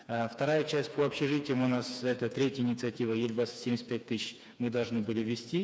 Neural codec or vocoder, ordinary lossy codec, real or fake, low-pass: codec, 16 kHz, 4 kbps, FreqCodec, smaller model; none; fake; none